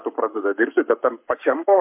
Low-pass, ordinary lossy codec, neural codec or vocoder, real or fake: 3.6 kHz; MP3, 32 kbps; codec, 44.1 kHz, 7.8 kbps, Pupu-Codec; fake